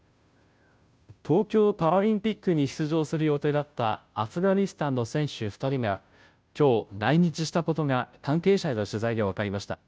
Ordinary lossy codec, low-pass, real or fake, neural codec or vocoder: none; none; fake; codec, 16 kHz, 0.5 kbps, FunCodec, trained on Chinese and English, 25 frames a second